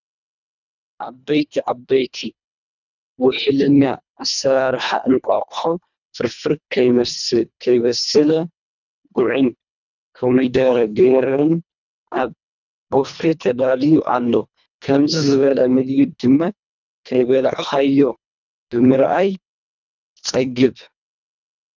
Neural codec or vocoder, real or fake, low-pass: codec, 24 kHz, 1.5 kbps, HILCodec; fake; 7.2 kHz